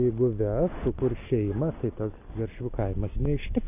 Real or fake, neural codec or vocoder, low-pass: real; none; 3.6 kHz